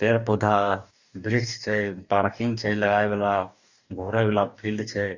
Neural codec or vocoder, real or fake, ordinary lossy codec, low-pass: codec, 44.1 kHz, 2.6 kbps, DAC; fake; Opus, 64 kbps; 7.2 kHz